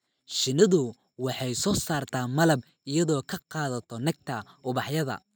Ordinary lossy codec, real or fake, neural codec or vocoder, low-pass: none; real; none; none